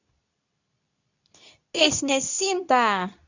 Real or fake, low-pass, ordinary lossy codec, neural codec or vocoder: fake; 7.2 kHz; none; codec, 24 kHz, 0.9 kbps, WavTokenizer, medium speech release version 2